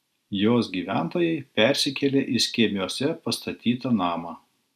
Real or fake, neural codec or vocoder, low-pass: real; none; 14.4 kHz